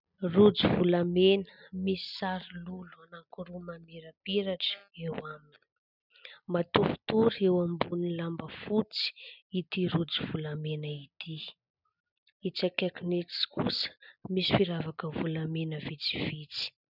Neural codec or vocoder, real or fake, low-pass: none; real; 5.4 kHz